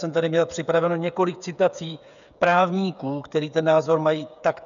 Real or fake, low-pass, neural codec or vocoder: fake; 7.2 kHz; codec, 16 kHz, 8 kbps, FreqCodec, smaller model